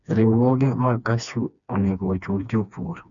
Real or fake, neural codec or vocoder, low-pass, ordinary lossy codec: fake; codec, 16 kHz, 2 kbps, FreqCodec, smaller model; 7.2 kHz; none